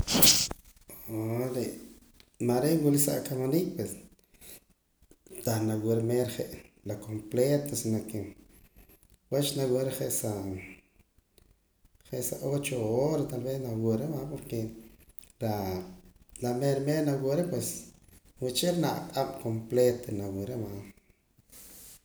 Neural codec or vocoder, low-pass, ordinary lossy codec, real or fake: none; none; none; real